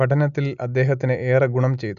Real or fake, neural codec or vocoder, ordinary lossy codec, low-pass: real; none; none; 7.2 kHz